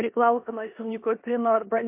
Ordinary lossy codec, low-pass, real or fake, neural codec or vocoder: MP3, 32 kbps; 3.6 kHz; fake; codec, 16 kHz in and 24 kHz out, 0.9 kbps, LongCat-Audio-Codec, four codebook decoder